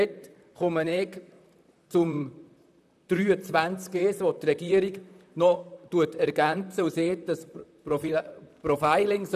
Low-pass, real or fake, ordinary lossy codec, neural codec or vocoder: 14.4 kHz; fake; none; vocoder, 44.1 kHz, 128 mel bands, Pupu-Vocoder